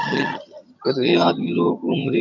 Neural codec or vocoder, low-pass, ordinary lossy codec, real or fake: vocoder, 22.05 kHz, 80 mel bands, HiFi-GAN; 7.2 kHz; none; fake